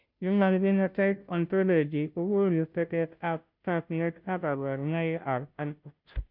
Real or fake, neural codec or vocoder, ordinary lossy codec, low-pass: fake; codec, 16 kHz, 0.5 kbps, FunCodec, trained on Chinese and English, 25 frames a second; none; 5.4 kHz